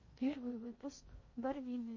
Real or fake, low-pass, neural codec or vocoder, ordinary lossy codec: fake; 7.2 kHz; codec, 16 kHz in and 24 kHz out, 0.6 kbps, FocalCodec, streaming, 2048 codes; MP3, 32 kbps